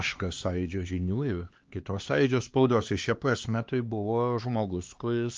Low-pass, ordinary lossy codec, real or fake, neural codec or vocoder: 7.2 kHz; Opus, 24 kbps; fake; codec, 16 kHz, 2 kbps, X-Codec, HuBERT features, trained on LibriSpeech